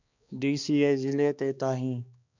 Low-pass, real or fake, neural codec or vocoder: 7.2 kHz; fake; codec, 16 kHz, 2 kbps, X-Codec, HuBERT features, trained on balanced general audio